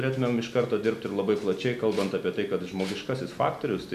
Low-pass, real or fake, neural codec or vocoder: 14.4 kHz; real; none